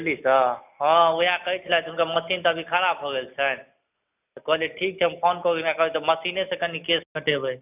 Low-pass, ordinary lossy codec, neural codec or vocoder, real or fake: 3.6 kHz; none; none; real